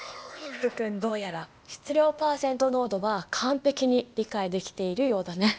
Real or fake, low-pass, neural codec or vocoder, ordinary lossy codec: fake; none; codec, 16 kHz, 0.8 kbps, ZipCodec; none